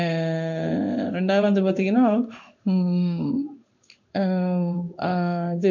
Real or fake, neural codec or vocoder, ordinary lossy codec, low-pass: fake; codec, 16 kHz in and 24 kHz out, 1 kbps, XY-Tokenizer; none; 7.2 kHz